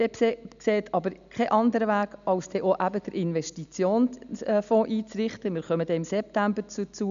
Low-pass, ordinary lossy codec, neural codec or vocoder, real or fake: 7.2 kHz; none; none; real